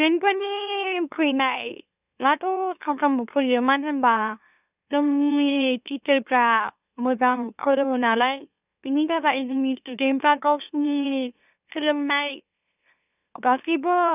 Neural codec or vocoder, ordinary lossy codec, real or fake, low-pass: autoencoder, 44.1 kHz, a latent of 192 numbers a frame, MeloTTS; none; fake; 3.6 kHz